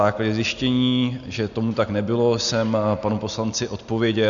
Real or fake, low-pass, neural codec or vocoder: real; 7.2 kHz; none